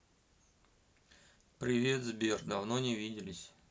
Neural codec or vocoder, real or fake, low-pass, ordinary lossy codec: none; real; none; none